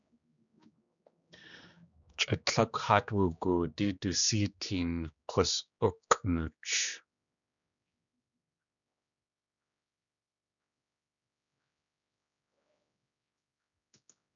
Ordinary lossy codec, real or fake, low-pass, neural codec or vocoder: MP3, 96 kbps; fake; 7.2 kHz; codec, 16 kHz, 2 kbps, X-Codec, HuBERT features, trained on general audio